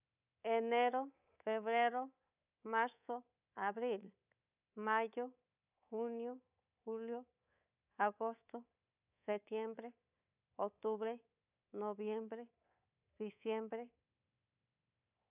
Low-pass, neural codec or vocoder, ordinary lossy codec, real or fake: 3.6 kHz; codec, 24 kHz, 3.1 kbps, DualCodec; none; fake